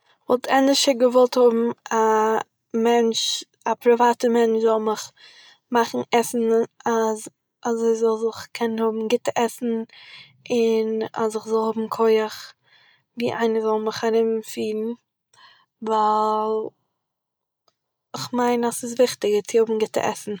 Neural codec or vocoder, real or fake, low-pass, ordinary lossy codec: none; real; none; none